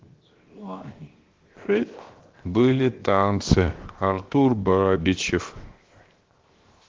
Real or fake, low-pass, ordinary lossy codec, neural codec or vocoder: fake; 7.2 kHz; Opus, 16 kbps; codec, 16 kHz, 0.7 kbps, FocalCodec